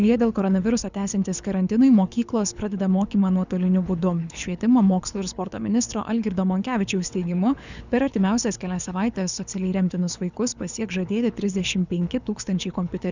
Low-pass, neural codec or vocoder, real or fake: 7.2 kHz; codec, 24 kHz, 6 kbps, HILCodec; fake